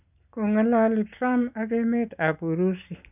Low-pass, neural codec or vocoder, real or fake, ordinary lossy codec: 3.6 kHz; none; real; none